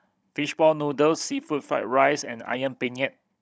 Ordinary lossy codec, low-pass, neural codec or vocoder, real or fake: none; none; codec, 16 kHz, 16 kbps, FunCodec, trained on Chinese and English, 50 frames a second; fake